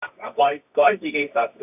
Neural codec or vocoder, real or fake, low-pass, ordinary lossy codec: codec, 24 kHz, 0.9 kbps, WavTokenizer, medium music audio release; fake; 3.6 kHz; none